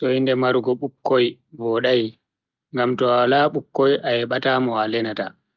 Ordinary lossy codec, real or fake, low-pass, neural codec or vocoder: Opus, 24 kbps; real; 7.2 kHz; none